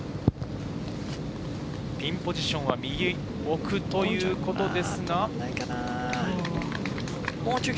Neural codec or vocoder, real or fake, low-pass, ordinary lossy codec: none; real; none; none